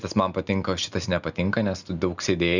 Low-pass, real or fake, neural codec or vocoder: 7.2 kHz; real; none